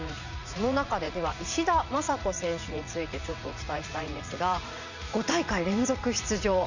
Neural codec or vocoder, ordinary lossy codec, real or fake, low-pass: vocoder, 44.1 kHz, 80 mel bands, Vocos; none; fake; 7.2 kHz